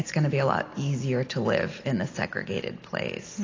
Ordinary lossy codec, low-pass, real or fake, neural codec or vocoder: AAC, 32 kbps; 7.2 kHz; real; none